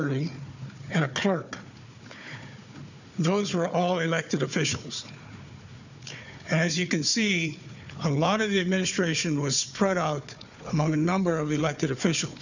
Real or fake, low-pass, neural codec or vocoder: fake; 7.2 kHz; codec, 16 kHz, 4 kbps, FunCodec, trained on Chinese and English, 50 frames a second